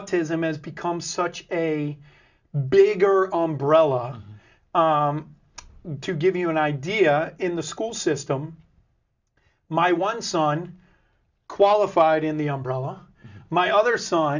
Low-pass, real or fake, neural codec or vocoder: 7.2 kHz; real; none